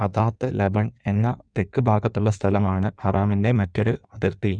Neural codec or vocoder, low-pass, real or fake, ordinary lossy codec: codec, 16 kHz in and 24 kHz out, 1.1 kbps, FireRedTTS-2 codec; 9.9 kHz; fake; none